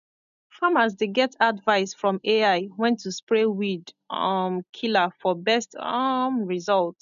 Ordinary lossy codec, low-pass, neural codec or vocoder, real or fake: none; 7.2 kHz; none; real